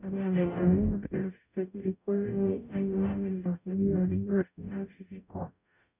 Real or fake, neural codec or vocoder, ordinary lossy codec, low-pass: fake; codec, 44.1 kHz, 0.9 kbps, DAC; none; 3.6 kHz